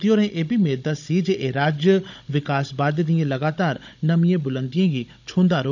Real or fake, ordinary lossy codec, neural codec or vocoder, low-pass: fake; none; codec, 16 kHz, 16 kbps, FunCodec, trained on Chinese and English, 50 frames a second; 7.2 kHz